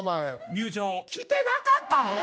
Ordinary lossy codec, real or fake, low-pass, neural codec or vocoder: none; fake; none; codec, 16 kHz, 1 kbps, X-Codec, HuBERT features, trained on general audio